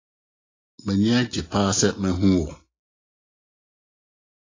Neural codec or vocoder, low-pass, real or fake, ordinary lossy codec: none; 7.2 kHz; real; AAC, 32 kbps